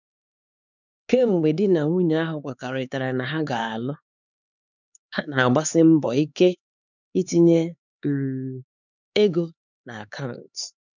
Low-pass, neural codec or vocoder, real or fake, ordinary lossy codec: 7.2 kHz; codec, 16 kHz, 4 kbps, X-Codec, HuBERT features, trained on LibriSpeech; fake; none